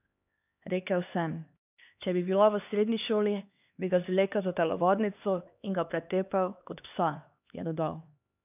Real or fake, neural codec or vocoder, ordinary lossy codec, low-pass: fake; codec, 16 kHz, 2 kbps, X-Codec, HuBERT features, trained on LibriSpeech; none; 3.6 kHz